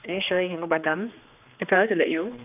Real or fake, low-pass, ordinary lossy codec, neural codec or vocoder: fake; 3.6 kHz; none; codec, 16 kHz, 2 kbps, X-Codec, HuBERT features, trained on general audio